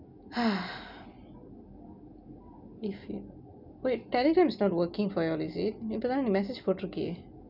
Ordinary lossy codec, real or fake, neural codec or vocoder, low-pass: none; real; none; 5.4 kHz